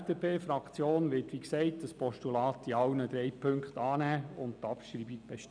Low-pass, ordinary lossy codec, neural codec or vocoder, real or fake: 9.9 kHz; none; none; real